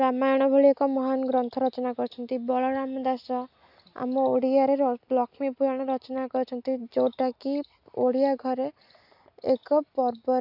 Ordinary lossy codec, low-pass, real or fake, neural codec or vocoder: AAC, 48 kbps; 5.4 kHz; real; none